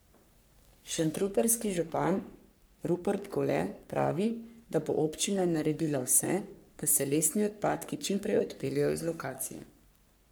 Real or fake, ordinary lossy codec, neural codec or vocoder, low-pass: fake; none; codec, 44.1 kHz, 3.4 kbps, Pupu-Codec; none